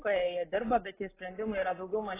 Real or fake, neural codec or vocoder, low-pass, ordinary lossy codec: real; none; 3.6 kHz; AAC, 16 kbps